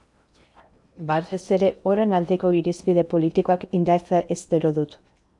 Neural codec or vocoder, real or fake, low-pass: codec, 16 kHz in and 24 kHz out, 0.8 kbps, FocalCodec, streaming, 65536 codes; fake; 10.8 kHz